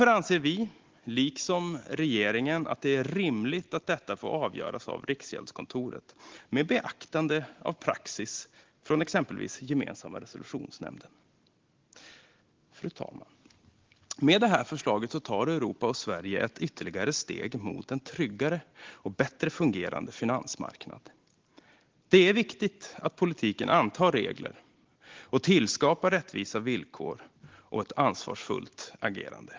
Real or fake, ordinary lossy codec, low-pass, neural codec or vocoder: real; Opus, 16 kbps; 7.2 kHz; none